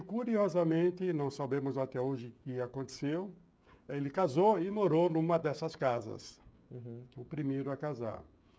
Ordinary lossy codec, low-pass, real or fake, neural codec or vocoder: none; none; fake; codec, 16 kHz, 16 kbps, FreqCodec, smaller model